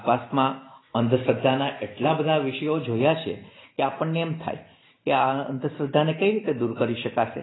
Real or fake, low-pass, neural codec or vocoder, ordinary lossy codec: real; 7.2 kHz; none; AAC, 16 kbps